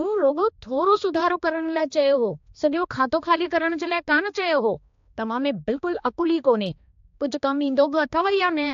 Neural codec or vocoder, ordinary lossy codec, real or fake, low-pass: codec, 16 kHz, 2 kbps, X-Codec, HuBERT features, trained on balanced general audio; AAC, 48 kbps; fake; 7.2 kHz